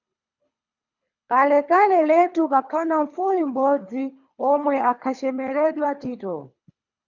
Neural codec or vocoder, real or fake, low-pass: codec, 24 kHz, 3 kbps, HILCodec; fake; 7.2 kHz